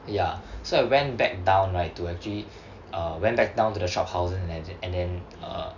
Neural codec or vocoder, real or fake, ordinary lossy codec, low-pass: none; real; none; 7.2 kHz